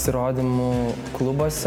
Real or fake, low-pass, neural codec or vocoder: real; 19.8 kHz; none